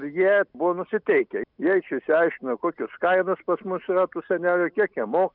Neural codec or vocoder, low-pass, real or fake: none; 5.4 kHz; real